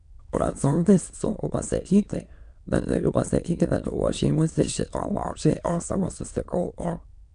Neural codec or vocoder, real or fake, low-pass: autoencoder, 22.05 kHz, a latent of 192 numbers a frame, VITS, trained on many speakers; fake; 9.9 kHz